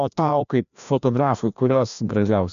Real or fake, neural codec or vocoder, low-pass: fake; codec, 16 kHz, 1 kbps, FreqCodec, larger model; 7.2 kHz